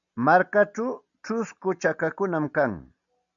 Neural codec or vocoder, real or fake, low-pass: none; real; 7.2 kHz